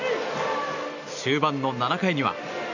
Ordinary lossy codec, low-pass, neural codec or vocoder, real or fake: none; 7.2 kHz; none; real